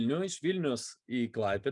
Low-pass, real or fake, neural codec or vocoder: 10.8 kHz; real; none